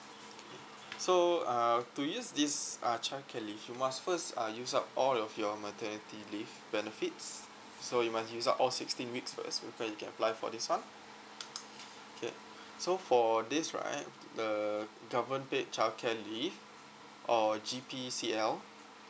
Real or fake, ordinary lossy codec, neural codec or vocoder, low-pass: real; none; none; none